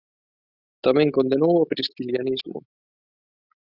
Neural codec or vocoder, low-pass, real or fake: none; 5.4 kHz; real